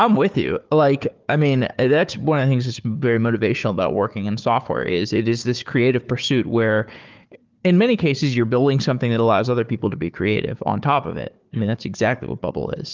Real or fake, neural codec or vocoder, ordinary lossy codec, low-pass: fake; codec, 16 kHz, 4 kbps, FunCodec, trained on Chinese and English, 50 frames a second; Opus, 32 kbps; 7.2 kHz